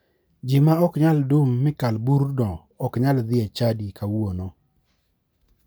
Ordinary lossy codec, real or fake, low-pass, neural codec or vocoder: none; real; none; none